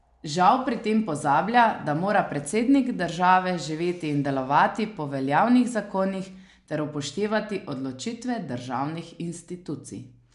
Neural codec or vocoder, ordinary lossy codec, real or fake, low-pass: none; MP3, 96 kbps; real; 10.8 kHz